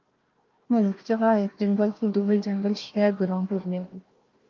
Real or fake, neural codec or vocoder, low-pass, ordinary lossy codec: fake; codec, 16 kHz, 1 kbps, FunCodec, trained on Chinese and English, 50 frames a second; 7.2 kHz; Opus, 32 kbps